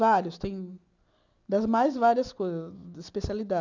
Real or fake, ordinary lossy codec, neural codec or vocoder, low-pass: real; none; none; 7.2 kHz